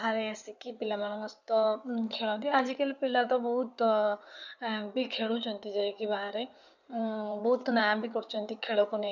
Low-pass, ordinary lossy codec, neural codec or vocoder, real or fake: 7.2 kHz; none; codec, 16 kHz in and 24 kHz out, 2.2 kbps, FireRedTTS-2 codec; fake